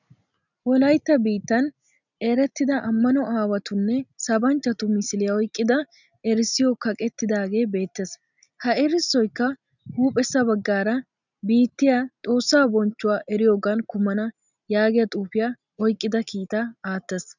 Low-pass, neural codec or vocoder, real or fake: 7.2 kHz; none; real